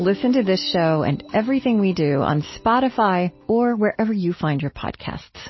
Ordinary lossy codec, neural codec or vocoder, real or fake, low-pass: MP3, 24 kbps; none; real; 7.2 kHz